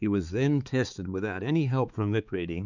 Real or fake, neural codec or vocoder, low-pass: fake; codec, 16 kHz, 4 kbps, X-Codec, HuBERT features, trained on balanced general audio; 7.2 kHz